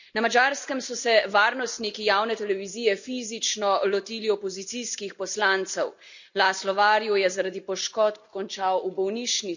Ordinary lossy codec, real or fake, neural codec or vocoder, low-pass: none; real; none; 7.2 kHz